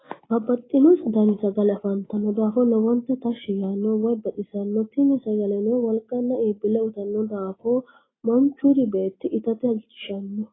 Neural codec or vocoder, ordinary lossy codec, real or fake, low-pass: none; AAC, 16 kbps; real; 7.2 kHz